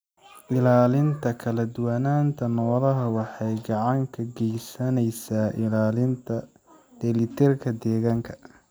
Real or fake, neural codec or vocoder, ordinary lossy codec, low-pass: real; none; none; none